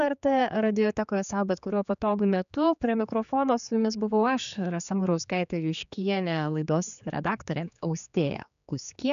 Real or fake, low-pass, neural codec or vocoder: fake; 7.2 kHz; codec, 16 kHz, 4 kbps, X-Codec, HuBERT features, trained on general audio